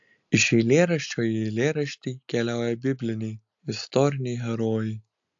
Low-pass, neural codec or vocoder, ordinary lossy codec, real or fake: 7.2 kHz; none; AAC, 64 kbps; real